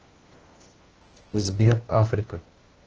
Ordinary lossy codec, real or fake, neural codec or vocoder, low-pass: Opus, 16 kbps; fake; codec, 16 kHz, 1 kbps, FunCodec, trained on LibriTTS, 50 frames a second; 7.2 kHz